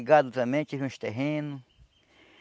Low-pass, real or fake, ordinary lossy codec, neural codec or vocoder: none; real; none; none